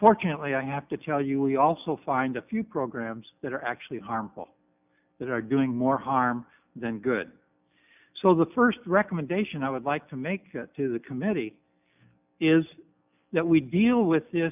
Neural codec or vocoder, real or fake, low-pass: none; real; 3.6 kHz